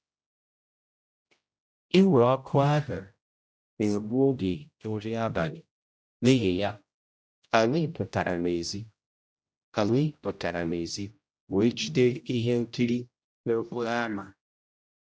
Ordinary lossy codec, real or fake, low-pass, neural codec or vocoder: none; fake; none; codec, 16 kHz, 0.5 kbps, X-Codec, HuBERT features, trained on general audio